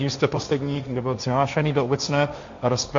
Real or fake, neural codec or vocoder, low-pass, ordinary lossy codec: fake; codec, 16 kHz, 1.1 kbps, Voila-Tokenizer; 7.2 kHz; MP3, 48 kbps